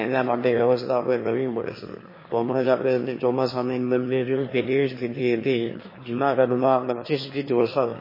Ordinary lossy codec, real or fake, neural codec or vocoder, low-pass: MP3, 24 kbps; fake; autoencoder, 22.05 kHz, a latent of 192 numbers a frame, VITS, trained on one speaker; 5.4 kHz